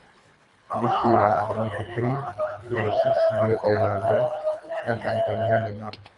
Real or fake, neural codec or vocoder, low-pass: fake; codec, 24 kHz, 3 kbps, HILCodec; 10.8 kHz